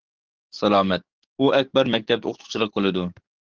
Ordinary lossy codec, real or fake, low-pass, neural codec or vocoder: Opus, 16 kbps; fake; 7.2 kHz; codec, 44.1 kHz, 7.8 kbps, DAC